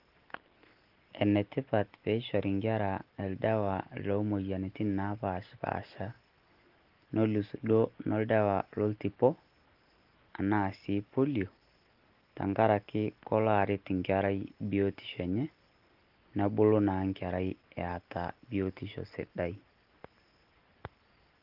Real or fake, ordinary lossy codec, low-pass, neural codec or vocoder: real; Opus, 16 kbps; 5.4 kHz; none